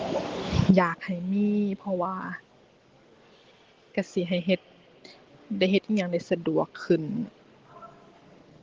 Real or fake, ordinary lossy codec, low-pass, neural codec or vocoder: real; Opus, 16 kbps; 7.2 kHz; none